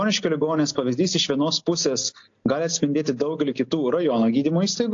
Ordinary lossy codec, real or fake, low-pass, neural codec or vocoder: AAC, 48 kbps; real; 7.2 kHz; none